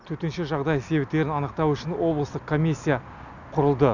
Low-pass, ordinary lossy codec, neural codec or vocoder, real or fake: 7.2 kHz; none; none; real